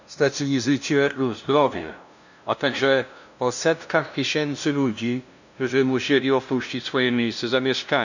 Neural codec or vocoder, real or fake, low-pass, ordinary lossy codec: codec, 16 kHz, 0.5 kbps, FunCodec, trained on LibriTTS, 25 frames a second; fake; 7.2 kHz; none